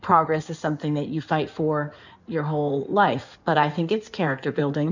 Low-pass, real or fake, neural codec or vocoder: 7.2 kHz; fake; codec, 16 kHz in and 24 kHz out, 2.2 kbps, FireRedTTS-2 codec